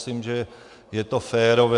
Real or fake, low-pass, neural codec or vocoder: real; 10.8 kHz; none